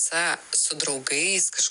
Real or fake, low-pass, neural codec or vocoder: real; 10.8 kHz; none